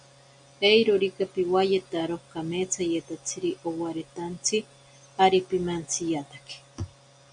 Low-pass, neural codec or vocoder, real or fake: 9.9 kHz; none; real